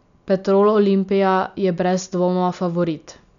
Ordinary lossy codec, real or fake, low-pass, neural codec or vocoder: none; real; 7.2 kHz; none